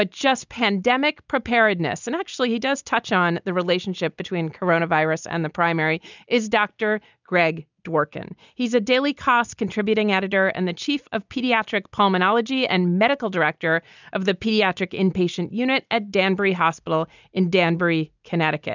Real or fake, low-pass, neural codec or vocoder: real; 7.2 kHz; none